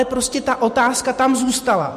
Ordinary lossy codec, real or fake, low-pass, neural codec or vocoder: MP3, 64 kbps; real; 14.4 kHz; none